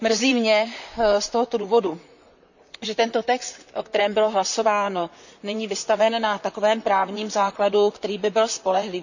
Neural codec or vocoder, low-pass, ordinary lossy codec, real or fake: vocoder, 44.1 kHz, 128 mel bands, Pupu-Vocoder; 7.2 kHz; none; fake